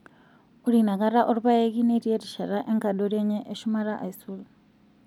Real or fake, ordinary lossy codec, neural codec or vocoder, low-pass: real; none; none; 19.8 kHz